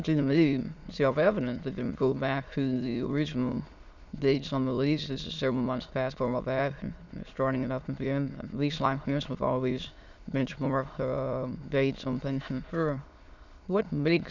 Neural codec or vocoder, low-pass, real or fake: autoencoder, 22.05 kHz, a latent of 192 numbers a frame, VITS, trained on many speakers; 7.2 kHz; fake